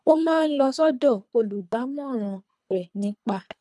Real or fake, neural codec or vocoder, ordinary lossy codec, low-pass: fake; codec, 24 kHz, 3 kbps, HILCodec; none; none